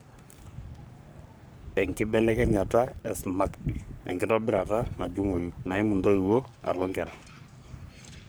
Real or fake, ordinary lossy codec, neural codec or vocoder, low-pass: fake; none; codec, 44.1 kHz, 3.4 kbps, Pupu-Codec; none